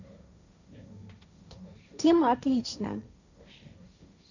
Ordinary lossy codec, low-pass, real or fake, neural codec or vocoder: none; 7.2 kHz; fake; codec, 16 kHz, 1.1 kbps, Voila-Tokenizer